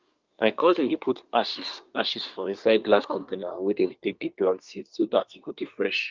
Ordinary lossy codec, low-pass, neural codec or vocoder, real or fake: Opus, 24 kbps; 7.2 kHz; codec, 24 kHz, 1 kbps, SNAC; fake